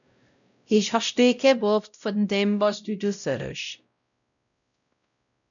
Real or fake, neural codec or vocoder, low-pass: fake; codec, 16 kHz, 0.5 kbps, X-Codec, WavLM features, trained on Multilingual LibriSpeech; 7.2 kHz